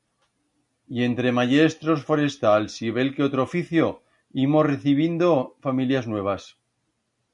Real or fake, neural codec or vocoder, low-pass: real; none; 10.8 kHz